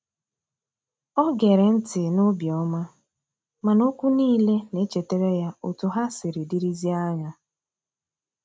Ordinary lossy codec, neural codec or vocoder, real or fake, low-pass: none; none; real; none